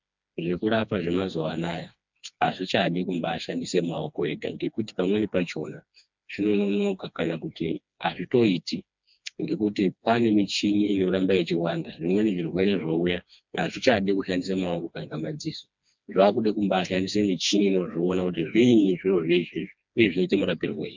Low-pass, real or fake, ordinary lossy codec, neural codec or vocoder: 7.2 kHz; fake; MP3, 64 kbps; codec, 16 kHz, 2 kbps, FreqCodec, smaller model